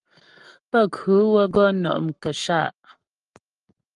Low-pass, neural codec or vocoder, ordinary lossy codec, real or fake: 10.8 kHz; codec, 44.1 kHz, 7.8 kbps, Pupu-Codec; Opus, 32 kbps; fake